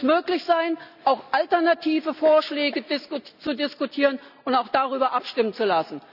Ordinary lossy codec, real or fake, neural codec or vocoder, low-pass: none; real; none; 5.4 kHz